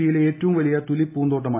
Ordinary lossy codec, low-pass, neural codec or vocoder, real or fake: AAC, 24 kbps; 3.6 kHz; none; real